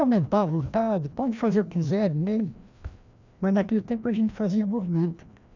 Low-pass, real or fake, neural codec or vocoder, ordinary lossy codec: 7.2 kHz; fake; codec, 16 kHz, 1 kbps, FreqCodec, larger model; none